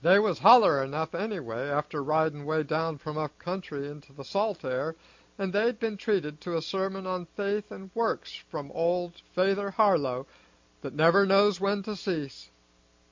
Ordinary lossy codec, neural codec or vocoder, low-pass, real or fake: MP3, 48 kbps; none; 7.2 kHz; real